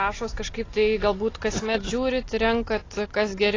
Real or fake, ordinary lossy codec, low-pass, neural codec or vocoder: real; AAC, 32 kbps; 7.2 kHz; none